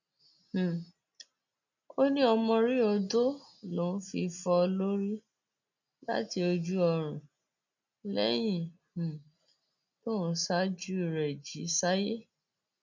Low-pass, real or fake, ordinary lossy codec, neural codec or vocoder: 7.2 kHz; real; none; none